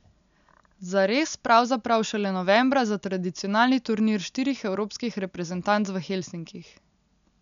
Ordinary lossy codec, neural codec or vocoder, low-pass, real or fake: none; none; 7.2 kHz; real